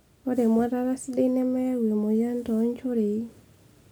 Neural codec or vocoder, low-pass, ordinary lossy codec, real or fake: none; none; none; real